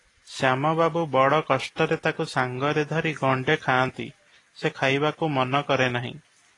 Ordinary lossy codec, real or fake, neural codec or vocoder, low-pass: AAC, 32 kbps; real; none; 10.8 kHz